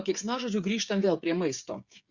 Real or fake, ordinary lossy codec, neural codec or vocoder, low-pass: fake; Opus, 64 kbps; codec, 16 kHz, 8 kbps, FreqCodec, smaller model; 7.2 kHz